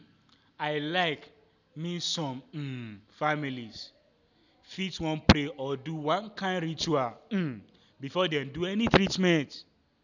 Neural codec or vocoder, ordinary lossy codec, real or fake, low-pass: none; none; real; 7.2 kHz